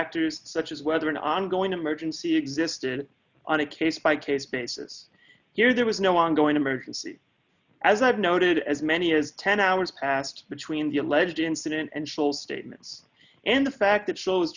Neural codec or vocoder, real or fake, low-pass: none; real; 7.2 kHz